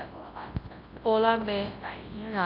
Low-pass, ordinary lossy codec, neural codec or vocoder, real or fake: 5.4 kHz; AAC, 32 kbps; codec, 24 kHz, 0.9 kbps, WavTokenizer, large speech release; fake